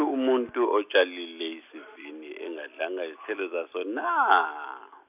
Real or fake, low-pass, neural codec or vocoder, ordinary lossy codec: real; 3.6 kHz; none; none